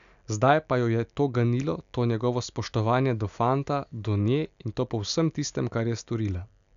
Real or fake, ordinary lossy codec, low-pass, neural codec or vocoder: real; none; 7.2 kHz; none